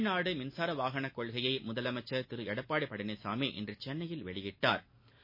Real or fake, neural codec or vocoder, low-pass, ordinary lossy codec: real; none; 5.4 kHz; MP3, 24 kbps